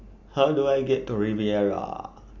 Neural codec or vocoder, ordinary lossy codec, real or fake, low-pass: none; none; real; 7.2 kHz